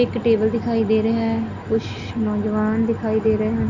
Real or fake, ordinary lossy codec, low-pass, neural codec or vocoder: real; MP3, 64 kbps; 7.2 kHz; none